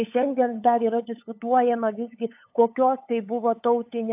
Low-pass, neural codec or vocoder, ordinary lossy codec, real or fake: 3.6 kHz; codec, 16 kHz, 8 kbps, FunCodec, trained on LibriTTS, 25 frames a second; AAC, 32 kbps; fake